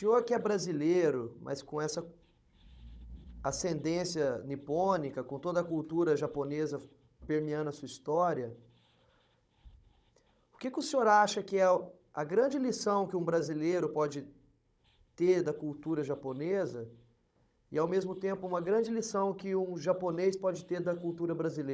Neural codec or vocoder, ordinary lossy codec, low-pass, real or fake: codec, 16 kHz, 16 kbps, FunCodec, trained on Chinese and English, 50 frames a second; none; none; fake